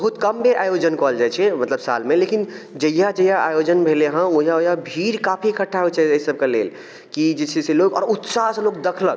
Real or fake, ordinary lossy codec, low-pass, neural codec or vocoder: real; none; none; none